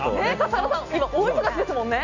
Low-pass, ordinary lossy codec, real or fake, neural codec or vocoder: 7.2 kHz; none; real; none